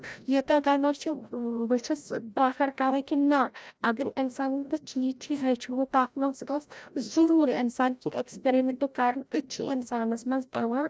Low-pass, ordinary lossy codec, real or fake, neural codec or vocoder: none; none; fake; codec, 16 kHz, 0.5 kbps, FreqCodec, larger model